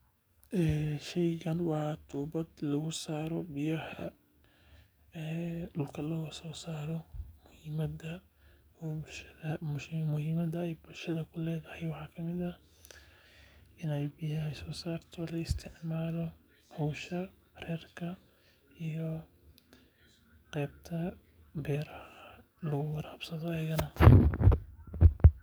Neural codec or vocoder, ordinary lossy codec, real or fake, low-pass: codec, 44.1 kHz, 7.8 kbps, DAC; none; fake; none